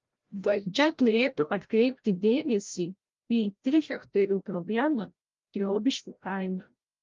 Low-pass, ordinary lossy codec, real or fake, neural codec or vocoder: 7.2 kHz; Opus, 32 kbps; fake; codec, 16 kHz, 0.5 kbps, FreqCodec, larger model